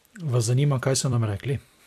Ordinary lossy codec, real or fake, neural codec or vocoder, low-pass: AAC, 96 kbps; fake; vocoder, 44.1 kHz, 128 mel bands every 256 samples, BigVGAN v2; 14.4 kHz